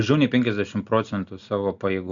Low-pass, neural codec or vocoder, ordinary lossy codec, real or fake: 7.2 kHz; none; Opus, 64 kbps; real